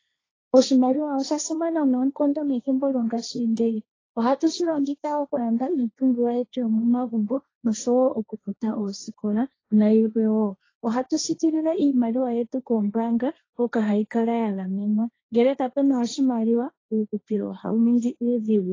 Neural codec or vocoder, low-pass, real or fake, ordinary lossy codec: codec, 16 kHz, 1.1 kbps, Voila-Tokenizer; 7.2 kHz; fake; AAC, 32 kbps